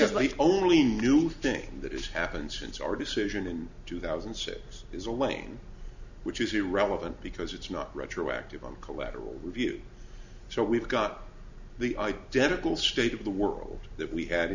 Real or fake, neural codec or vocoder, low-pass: real; none; 7.2 kHz